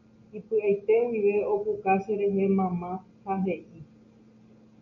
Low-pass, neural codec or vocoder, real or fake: 7.2 kHz; none; real